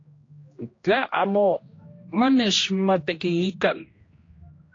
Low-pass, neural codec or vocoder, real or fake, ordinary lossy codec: 7.2 kHz; codec, 16 kHz, 1 kbps, X-Codec, HuBERT features, trained on general audio; fake; AAC, 32 kbps